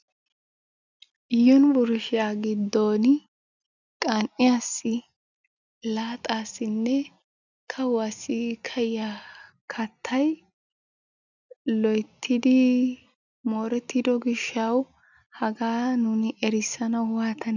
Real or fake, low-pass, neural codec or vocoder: real; 7.2 kHz; none